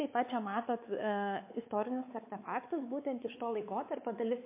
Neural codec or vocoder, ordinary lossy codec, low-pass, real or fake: codec, 16 kHz, 4 kbps, X-Codec, WavLM features, trained on Multilingual LibriSpeech; MP3, 24 kbps; 3.6 kHz; fake